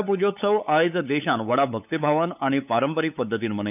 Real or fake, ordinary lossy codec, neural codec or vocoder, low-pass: fake; none; codec, 16 kHz, 8 kbps, FunCodec, trained on LibriTTS, 25 frames a second; 3.6 kHz